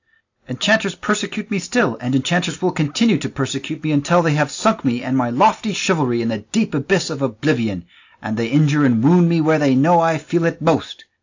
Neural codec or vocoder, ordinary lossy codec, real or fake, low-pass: none; AAC, 48 kbps; real; 7.2 kHz